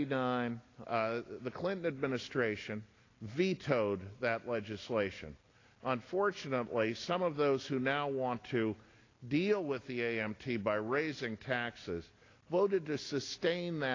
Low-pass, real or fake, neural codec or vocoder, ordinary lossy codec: 7.2 kHz; real; none; AAC, 32 kbps